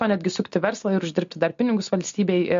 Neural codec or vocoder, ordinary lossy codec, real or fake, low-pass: none; MP3, 48 kbps; real; 7.2 kHz